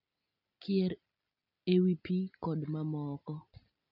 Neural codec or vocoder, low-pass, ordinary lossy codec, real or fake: none; 5.4 kHz; none; real